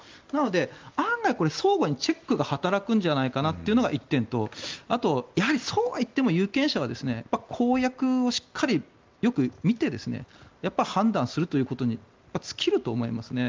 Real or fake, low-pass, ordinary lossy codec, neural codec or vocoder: real; 7.2 kHz; Opus, 32 kbps; none